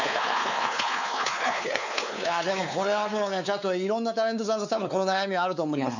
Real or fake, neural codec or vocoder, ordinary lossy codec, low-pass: fake; codec, 16 kHz, 4 kbps, X-Codec, WavLM features, trained on Multilingual LibriSpeech; none; 7.2 kHz